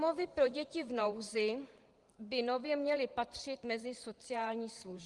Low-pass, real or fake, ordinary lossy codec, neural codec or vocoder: 10.8 kHz; fake; Opus, 24 kbps; vocoder, 44.1 kHz, 128 mel bands every 512 samples, BigVGAN v2